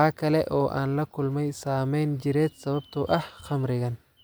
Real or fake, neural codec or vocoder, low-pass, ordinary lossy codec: real; none; none; none